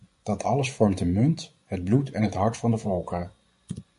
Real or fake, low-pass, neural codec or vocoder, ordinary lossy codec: fake; 10.8 kHz; vocoder, 24 kHz, 100 mel bands, Vocos; MP3, 48 kbps